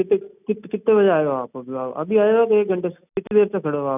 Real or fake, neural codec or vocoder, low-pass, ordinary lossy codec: real; none; 3.6 kHz; none